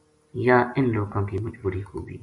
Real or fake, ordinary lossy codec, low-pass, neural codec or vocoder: real; MP3, 48 kbps; 10.8 kHz; none